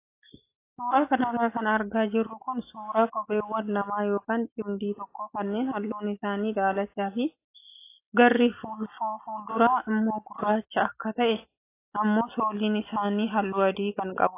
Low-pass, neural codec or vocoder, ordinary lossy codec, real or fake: 3.6 kHz; none; AAC, 24 kbps; real